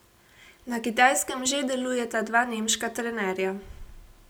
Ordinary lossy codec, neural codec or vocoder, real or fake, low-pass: none; none; real; none